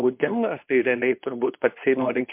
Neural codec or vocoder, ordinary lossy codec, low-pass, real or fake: codec, 24 kHz, 0.9 kbps, WavTokenizer, medium speech release version 2; MP3, 32 kbps; 3.6 kHz; fake